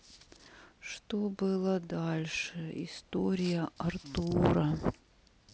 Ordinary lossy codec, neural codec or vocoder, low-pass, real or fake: none; none; none; real